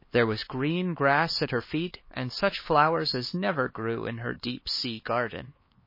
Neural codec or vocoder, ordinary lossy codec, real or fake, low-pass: codec, 16 kHz, 4 kbps, X-Codec, HuBERT features, trained on LibriSpeech; MP3, 24 kbps; fake; 5.4 kHz